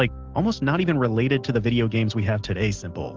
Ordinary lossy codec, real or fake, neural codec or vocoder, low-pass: Opus, 16 kbps; real; none; 7.2 kHz